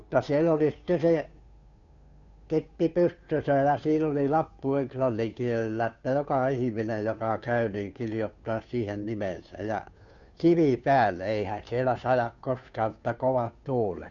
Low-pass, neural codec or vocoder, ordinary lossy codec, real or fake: 7.2 kHz; codec, 16 kHz, 2 kbps, FunCodec, trained on Chinese and English, 25 frames a second; none; fake